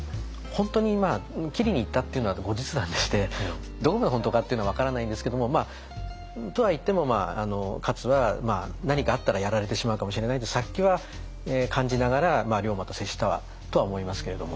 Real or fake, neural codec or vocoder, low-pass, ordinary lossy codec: real; none; none; none